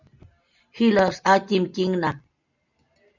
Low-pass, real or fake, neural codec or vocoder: 7.2 kHz; real; none